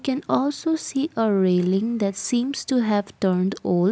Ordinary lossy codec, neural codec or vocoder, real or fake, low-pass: none; none; real; none